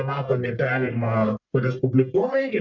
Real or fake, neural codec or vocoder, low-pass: fake; codec, 44.1 kHz, 1.7 kbps, Pupu-Codec; 7.2 kHz